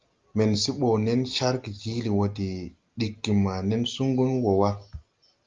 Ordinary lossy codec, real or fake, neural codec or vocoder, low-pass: Opus, 32 kbps; real; none; 7.2 kHz